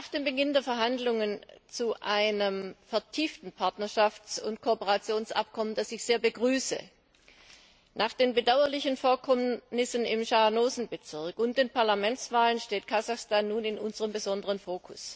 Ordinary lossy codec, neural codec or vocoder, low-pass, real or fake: none; none; none; real